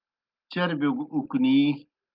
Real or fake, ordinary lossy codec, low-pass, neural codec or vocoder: real; Opus, 24 kbps; 5.4 kHz; none